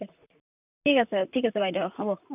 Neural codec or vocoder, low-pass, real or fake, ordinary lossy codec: vocoder, 44.1 kHz, 128 mel bands, Pupu-Vocoder; 3.6 kHz; fake; none